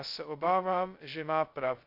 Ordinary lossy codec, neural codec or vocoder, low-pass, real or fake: AAC, 32 kbps; codec, 16 kHz, 0.2 kbps, FocalCodec; 5.4 kHz; fake